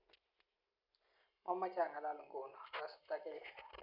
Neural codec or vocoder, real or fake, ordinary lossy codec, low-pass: none; real; none; 5.4 kHz